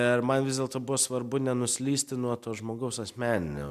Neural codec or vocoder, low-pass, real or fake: none; 14.4 kHz; real